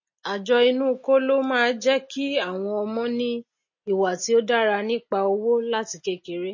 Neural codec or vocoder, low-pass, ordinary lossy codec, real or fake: none; 7.2 kHz; MP3, 32 kbps; real